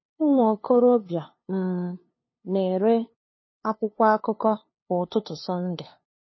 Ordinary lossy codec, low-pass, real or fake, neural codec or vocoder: MP3, 24 kbps; 7.2 kHz; fake; codec, 16 kHz, 2 kbps, FunCodec, trained on LibriTTS, 25 frames a second